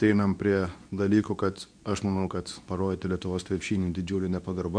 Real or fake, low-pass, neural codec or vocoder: fake; 9.9 kHz; codec, 24 kHz, 0.9 kbps, WavTokenizer, medium speech release version 2